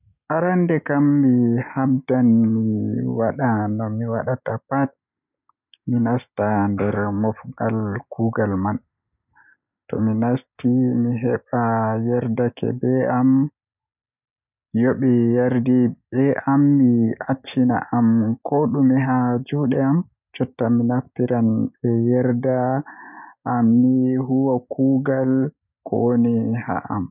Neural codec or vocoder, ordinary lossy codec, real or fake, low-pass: none; none; real; 3.6 kHz